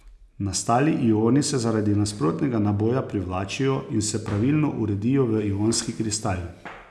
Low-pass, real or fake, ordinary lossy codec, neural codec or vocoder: none; real; none; none